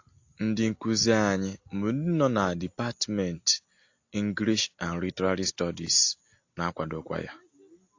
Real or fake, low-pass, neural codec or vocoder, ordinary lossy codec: real; 7.2 kHz; none; AAC, 48 kbps